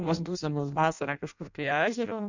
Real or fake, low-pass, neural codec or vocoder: fake; 7.2 kHz; codec, 16 kHz in and 24 kHz out, 0.6 kbps, FireRedTTS-2 codec